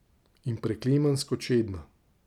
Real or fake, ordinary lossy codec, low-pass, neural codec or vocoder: real; none; 19.8 kHz; none